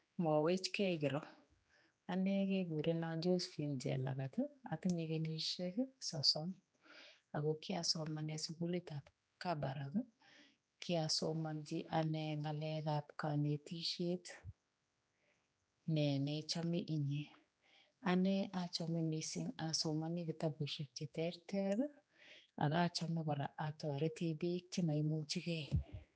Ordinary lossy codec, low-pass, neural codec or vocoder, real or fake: none; none; codec, 16 kHz, 2 kbps, X-Codec, HuBERT features, trained on general audio; fake